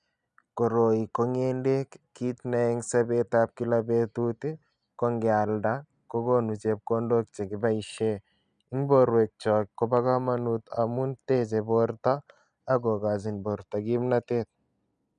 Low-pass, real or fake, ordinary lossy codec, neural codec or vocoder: 9.9 kHz; real; none; none